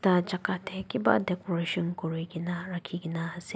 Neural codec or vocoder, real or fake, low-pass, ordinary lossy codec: none; real; none; none